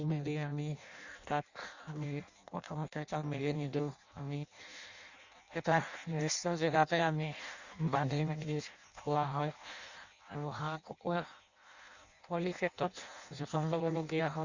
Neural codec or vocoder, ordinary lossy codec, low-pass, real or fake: codec, 16 kHz in and 24 kHz out, 0.6 kbps, FireRedTTS-2 codec; Opus, 64 kbps; 7.2 kHz; fake